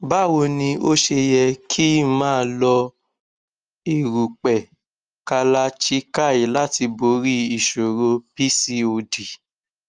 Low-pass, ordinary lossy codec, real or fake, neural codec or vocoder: 9.9 kHz; Opus, 32 kbps; real; none